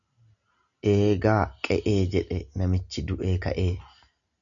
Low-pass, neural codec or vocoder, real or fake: 7.2 kHz; none; real